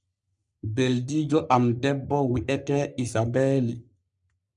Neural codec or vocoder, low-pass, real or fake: codec, 44.1 kHz, 3.4 kbps, Pupu-Codec; 10.8 kHz; fake